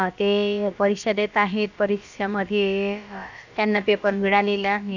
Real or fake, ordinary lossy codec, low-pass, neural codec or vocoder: fake; none; 7.2 kHz; codec, 16 kHz, about 1 kbps, DyCAST, with the encoder's durations